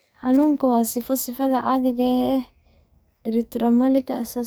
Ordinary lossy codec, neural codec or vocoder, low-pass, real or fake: none; codec, 44.1 kHz, 2.6 kbps, SNAC; none; fake